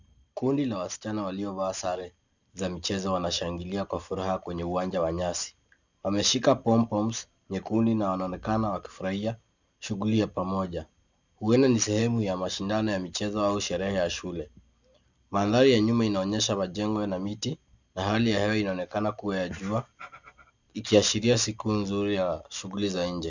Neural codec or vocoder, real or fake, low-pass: none; real; 7.2 kHz